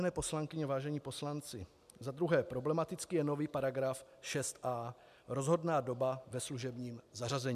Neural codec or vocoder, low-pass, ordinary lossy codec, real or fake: none; 14.4 kHz; MP3, 96 kbps; real